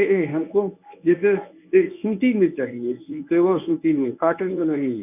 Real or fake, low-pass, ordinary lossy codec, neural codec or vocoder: fake; 3.6 kHz; none; codec, 24 kHz, 0.9 kbps, WavTokenizer, medium speech release version 1